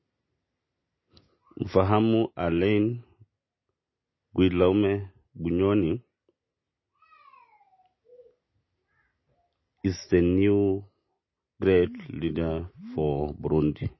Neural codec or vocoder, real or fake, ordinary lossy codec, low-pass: none; real; MP3, 24 kbps; 7.2 kHz